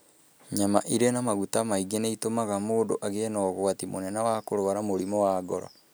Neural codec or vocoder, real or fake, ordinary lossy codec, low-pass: none; real; none; none